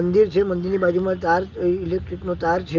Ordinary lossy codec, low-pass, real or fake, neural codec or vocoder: Opus, 32 kbps; 7.2 kHz; real; none